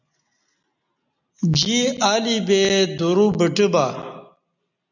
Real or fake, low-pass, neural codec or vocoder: real; 7.2 kHz; none